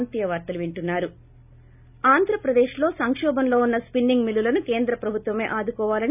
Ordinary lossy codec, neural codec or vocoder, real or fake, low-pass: none; none; real; 3.6 kHz